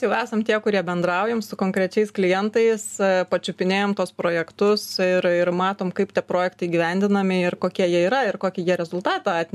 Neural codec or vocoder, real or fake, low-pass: none; real; 14.4 kHz